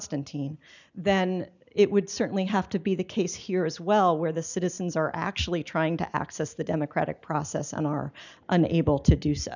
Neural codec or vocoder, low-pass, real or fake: none; 7.2 kHz; real